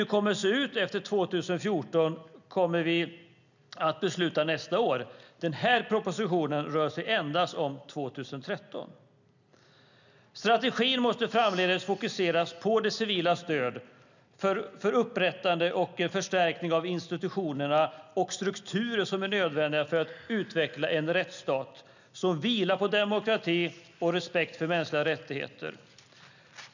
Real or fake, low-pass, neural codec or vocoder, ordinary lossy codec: real; 7.2 kHz; none; none